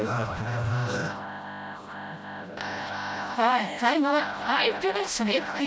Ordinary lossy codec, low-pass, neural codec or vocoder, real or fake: none; none; codec, 16 kHz, 0.5 kbps, FreqCodec, smaller model; fake